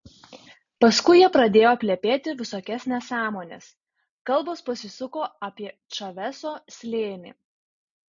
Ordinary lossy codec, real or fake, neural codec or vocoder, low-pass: AAC, 64 kbps; real; none; 7.2 kHz